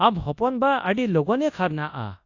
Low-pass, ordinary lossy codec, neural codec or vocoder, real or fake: 7.2 kHz; AAC, 48 kbps; codec, 24 kHz, 0.9 kbps, WavTokenizer, large speech release; fake